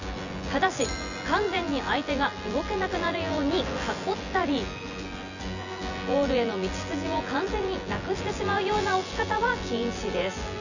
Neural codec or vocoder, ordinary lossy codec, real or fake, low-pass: vocoder, 24 kHz, 100 mel bands, Vocos; none; fake; 7.2 kHz